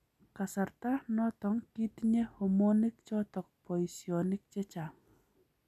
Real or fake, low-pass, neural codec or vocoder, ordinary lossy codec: real; 14.4 kHz; none; none